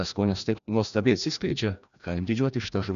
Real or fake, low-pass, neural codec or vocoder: fake; 7.2 kHz; codec, 16 kHz, 1 kbps, FreqCodec, larger model